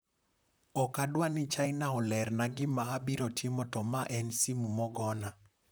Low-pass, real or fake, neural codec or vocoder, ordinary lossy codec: none; fake; vocoder, 44.1 kHz, 128 mel bands, Pupu-Vocoder; none